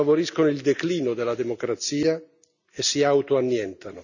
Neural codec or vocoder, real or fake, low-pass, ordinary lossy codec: none; real; 7.2 kHz; none